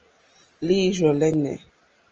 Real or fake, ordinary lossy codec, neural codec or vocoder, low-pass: real; Opus, 24 kbps; none; 7.2 kHz